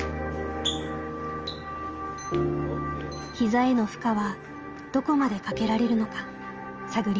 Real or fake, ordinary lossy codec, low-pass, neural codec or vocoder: real; Opus, 24 kbps; 7.2 kHz; none